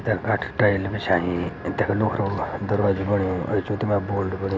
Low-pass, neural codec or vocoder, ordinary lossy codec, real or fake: none; none; none; real